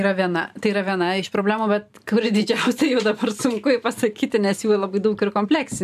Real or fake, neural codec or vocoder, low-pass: fake; vocoder, 44.1 kHz, 128 mel bands every 512 samples, BigVGAN v2; 14.4 kHz